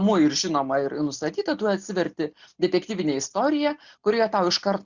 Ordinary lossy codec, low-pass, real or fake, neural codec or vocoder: Opus, 64 kbps; 7.2 kHz; real; none